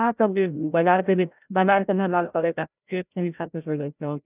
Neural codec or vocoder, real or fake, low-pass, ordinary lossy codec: codec, 16 kHz, 0.5 kbps, FreqCodec, larger model; fake; 3.6 kHz; none